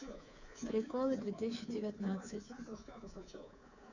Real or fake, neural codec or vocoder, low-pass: fake; codec, 24 kHz, 3.1 kbps, DualCodec; 7.2 kHz